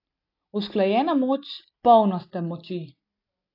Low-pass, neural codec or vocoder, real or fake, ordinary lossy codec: 5.4 kHz; none; real; AAC, 48 kbps